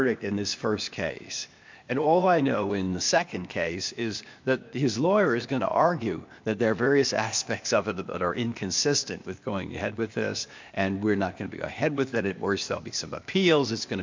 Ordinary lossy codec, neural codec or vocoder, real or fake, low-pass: MP3, 64 kbps; codec, 16 kHz, 0.8 kbps, ZipCodec; fake; 7.2 kHz